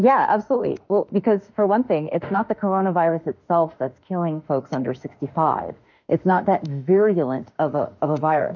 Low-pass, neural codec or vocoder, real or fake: 7.2 kHz; autoencoder, 48 kHz, 32 numbers a frame, DAC-VAE, trained on Japanese speech; fake